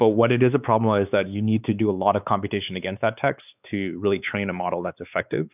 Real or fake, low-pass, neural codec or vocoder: fake; 3.6 kHz; codec, 16 kHz, 4 kbps, X-Codec, WavLM features, trained on Multilingual LibriSpeech